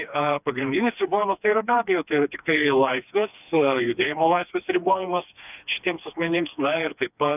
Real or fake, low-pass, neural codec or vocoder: fake; 3.6 kHz; codec, 16 kHz, 2 kbps, FreqCodec, smaller model